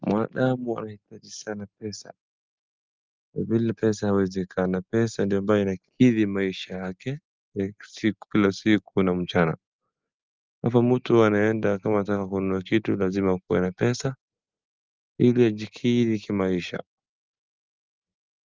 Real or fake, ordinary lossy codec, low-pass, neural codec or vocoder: real; Opus, 32 kbps; 7.2 kHz; none